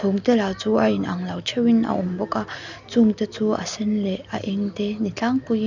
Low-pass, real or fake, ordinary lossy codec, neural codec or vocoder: 7.2 kHz; real; none; none